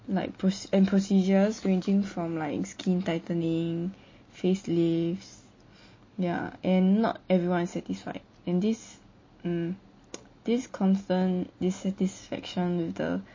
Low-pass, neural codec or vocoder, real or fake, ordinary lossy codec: 7.2 kHz; none; real; MP3, 32 kbps